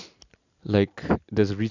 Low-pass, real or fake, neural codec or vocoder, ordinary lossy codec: 7.2 kHz; real; none; none